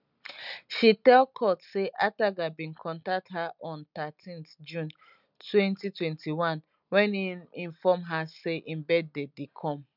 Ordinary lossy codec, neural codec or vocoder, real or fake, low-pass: none; none; real; 5.4 kHz